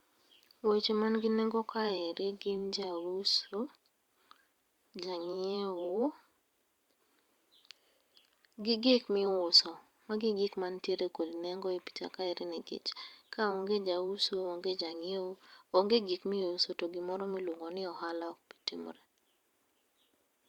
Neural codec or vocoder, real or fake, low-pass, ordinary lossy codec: vocoder, 44.1 kHz, 128 mel bands every 512 samples, BigVGAN v2; fake; 19.8 kHz; Opus, 64 kbps